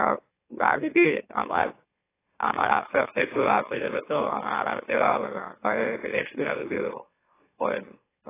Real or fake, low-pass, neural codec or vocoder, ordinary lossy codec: fake; 3.6 kHz; autoencoder, 44.1 kHz, a latent of 192 numbers a frame, MeloTTS; AAC, 16 kbps